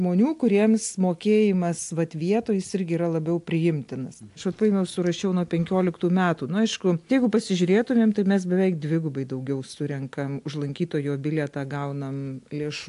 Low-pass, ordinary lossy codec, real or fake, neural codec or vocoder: 10.8 kHz; AAC, 64 kbps; real; none